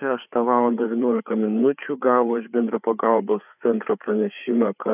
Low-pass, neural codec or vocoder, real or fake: 3.6 kHz; codec, 16 kHz, 4 kbps, FreqCodec, larger model; fake